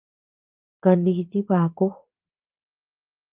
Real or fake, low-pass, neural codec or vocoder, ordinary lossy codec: fake; 3.6 kHz; vocoder, 44.1 kHz, 80 mel bands, Vocos; Opus, 32 kbps